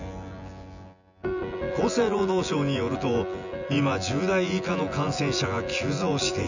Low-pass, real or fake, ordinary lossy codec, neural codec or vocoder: 7.2 kHz; fake; none; vocoder, 24 kHz, 100 mel bands, Vocos